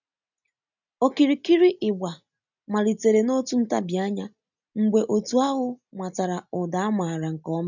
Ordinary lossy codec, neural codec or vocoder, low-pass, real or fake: none; none; 7.2 kHz; real